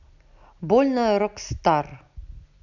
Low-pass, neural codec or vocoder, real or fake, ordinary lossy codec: 7.2 kHz; none; real; none